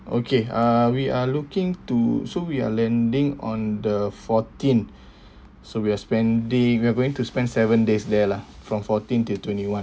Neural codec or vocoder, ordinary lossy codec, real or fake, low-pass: none; none; real; none